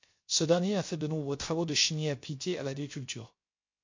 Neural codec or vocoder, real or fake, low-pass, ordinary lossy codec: codec, 16 kHz, 0.3 kbps, FocalCodec; fake; 7.2 kHz; MP3, 48 kbps